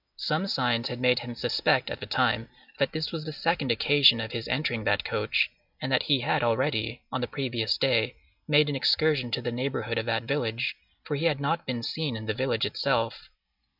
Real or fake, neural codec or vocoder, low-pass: real; none; 5.4 kHz